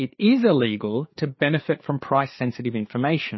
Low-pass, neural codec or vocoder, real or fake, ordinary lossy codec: 7.2 kHz; codec, 16 kHz, 4 kbps, FreqCodec, larger model; fake; MP3, 24 kbps